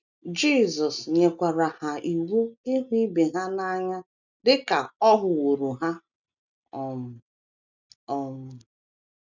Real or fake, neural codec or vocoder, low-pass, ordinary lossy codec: real; none; 7.2 kHz; none